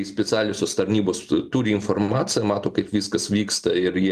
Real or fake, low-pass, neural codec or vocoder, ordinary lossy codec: real; 14.4 kHz; none; Opus, 32 kbps